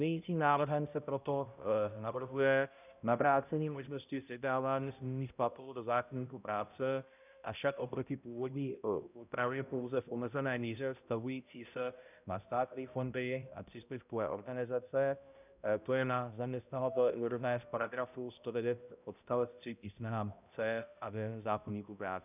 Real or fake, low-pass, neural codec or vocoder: fake; 3.6 kHz; codec, 16 kHz, 0.5 kbps, X-Codec, HuBERT features, trained on balanced general audio